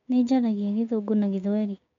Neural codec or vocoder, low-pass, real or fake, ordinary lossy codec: codec, 16 kHz, 6 kbps, DAC; 7.2 kHz; fake; MP3, 48 kbps